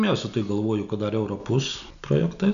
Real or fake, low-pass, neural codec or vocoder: real; 7.2 kHz; none